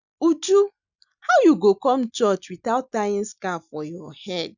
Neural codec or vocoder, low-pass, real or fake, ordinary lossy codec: none; 7.2 kHz; real; none